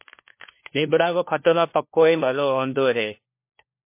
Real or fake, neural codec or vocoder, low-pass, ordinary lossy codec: fake; codec, 16 kHz, 1 kbps, FunCodec, trained on LibriTTS, 50 frames a second; 3.6 kHz; MP3, 24 kbps